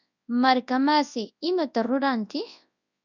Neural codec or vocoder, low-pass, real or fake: codec, 24 kHz, 0.9 kbps, WavTokenizer, large speech release; 7.2 kHz; fake